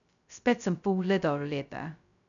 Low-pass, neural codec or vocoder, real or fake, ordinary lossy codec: 7.2 kHz; codec, 16 kHz, 0.2 kbps, FocalCodec; fake; none